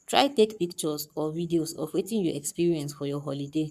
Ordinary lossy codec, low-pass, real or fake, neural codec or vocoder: none; 14.4 kHz; fake; codec, 44.1 kHz, 7.8 kbps, Pupu-Codec